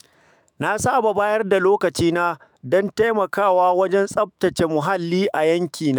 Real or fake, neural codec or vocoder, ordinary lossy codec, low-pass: fake; autoencoder, 48 kHz, 128 numbers a frame, DAC-VAE, trained on Japanese speech; none; none